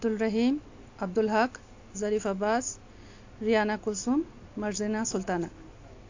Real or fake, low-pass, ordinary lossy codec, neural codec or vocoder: real; 7.2 kHz; AAC, 48 kbps; none